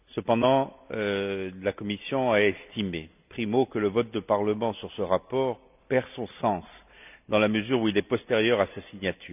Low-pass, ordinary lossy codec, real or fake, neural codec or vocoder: 3.6 kHz; none; real; none